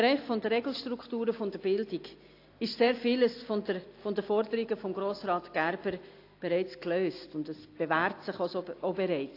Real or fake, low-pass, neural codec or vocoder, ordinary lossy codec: real; 5.4 kHz; none; AAC, 32 kbps